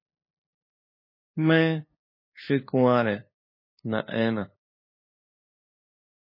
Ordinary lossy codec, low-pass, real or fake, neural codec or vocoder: MP3, 24 kbps; 5.4 kHz; fake; codec, 16 kHz, 2 kbps, FunCodec, trained on LibriTTS, 25 frames a second